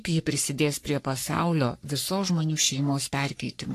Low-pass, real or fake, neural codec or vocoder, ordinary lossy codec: 14.4 kHz; fake; codec, 44.1 kHz, 3.4 kbps, Pupu-Codec; AAC, 64 kbps